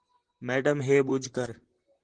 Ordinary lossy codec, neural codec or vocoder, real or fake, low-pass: Opus, 16 kbps; vocoder, 44.1 kHz, 128 mel bands, Pupu-Vocoder; fake; 9.9 kHz